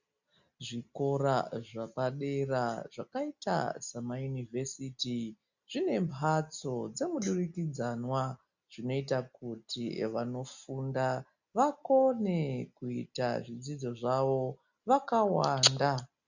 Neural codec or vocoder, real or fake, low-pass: none; real; 7.2 kHz